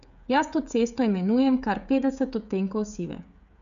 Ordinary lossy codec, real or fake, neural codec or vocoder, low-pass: none; fake; codec, 16 kHz, 16 kbps, FreqCodec, smaller model; 7.2 kHz